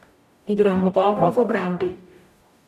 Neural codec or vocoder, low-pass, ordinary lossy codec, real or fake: codec, 44.1 kHz, 0.9 kbps, DAC; 14.4 kHz; none; fake